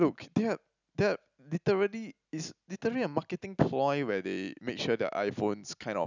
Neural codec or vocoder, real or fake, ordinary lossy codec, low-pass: none; real; none; 7.2 kHz